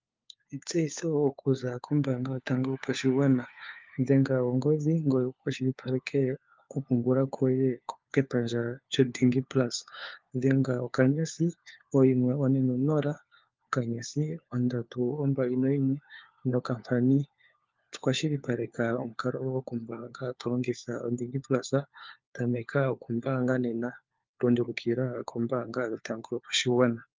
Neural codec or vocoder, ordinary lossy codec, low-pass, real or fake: codec, 16 kHz, 4 kbps, FunCodec, trained on LibriTTS, 50 frames a second; Opus, 24 kbps; 7.2 kHz; fake